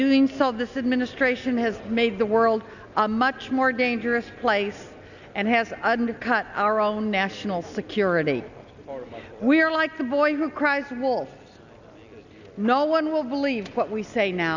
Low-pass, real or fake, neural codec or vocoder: 7.2 kHz; real; none